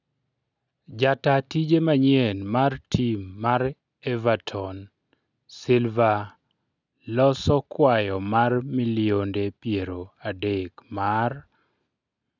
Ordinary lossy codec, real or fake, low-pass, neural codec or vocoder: none; real; 7.2 kHz; none